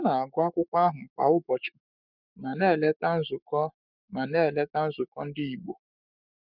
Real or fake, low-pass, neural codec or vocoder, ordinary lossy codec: fake; 5.4 kHz; codec, 44.1 kHz, 7.8 kbps, Pupu-Codec; none